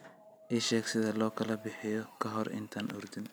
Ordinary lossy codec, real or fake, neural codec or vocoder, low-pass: none; real; none; none